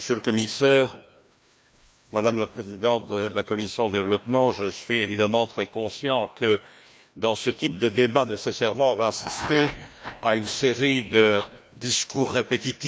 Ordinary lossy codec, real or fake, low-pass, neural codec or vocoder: none; fake; none; codec, 16 kHz, 1 kbps, FreqCodec, larger model